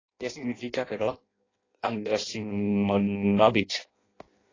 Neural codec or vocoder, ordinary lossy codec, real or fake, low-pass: codec, 16 kHz in and 24 kHz out, 0.6 kbps, FireRedTTS-2 codec; AAC, 32 kbps; fake; 7.2 kHz